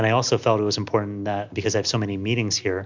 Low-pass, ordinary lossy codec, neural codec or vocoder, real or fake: 7.2 kHz; MP3, 64 kbps; none; real